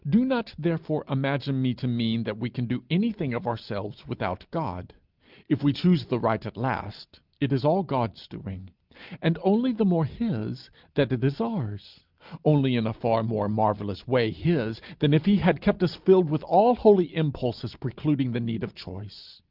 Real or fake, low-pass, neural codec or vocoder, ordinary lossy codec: real; 5.4 kHz; none; Opus, 24 kbps